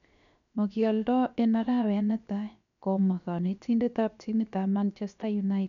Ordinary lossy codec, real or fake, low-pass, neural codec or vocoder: none; fake; 7.2 kHz; codec, 16 kHz, 0.7 kbps, FocalCodec